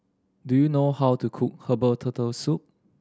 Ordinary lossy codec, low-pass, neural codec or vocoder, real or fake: none; none; none; real